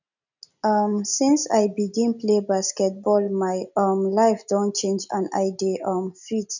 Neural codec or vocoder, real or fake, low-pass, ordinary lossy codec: none; real; 7.2 kHz; none